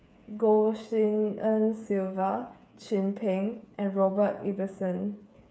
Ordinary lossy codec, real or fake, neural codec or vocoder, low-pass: none; fake; codec, 16 kHz, 8 kbps, FreqCodec, smaller model; none